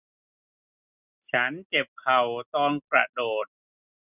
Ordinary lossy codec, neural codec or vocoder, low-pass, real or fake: none; none; 3.6 kHz; real